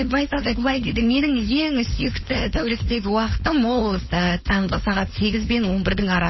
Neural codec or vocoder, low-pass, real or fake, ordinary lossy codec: codec, 16 kHz, 4.8 kbps, FACodec; 7.2 kHz; fake; MP3, 24 kbps